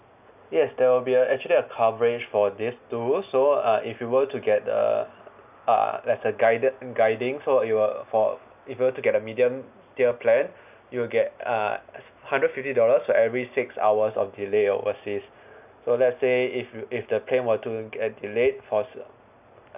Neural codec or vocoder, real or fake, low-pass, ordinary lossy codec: none; real; 3.6 kHz; none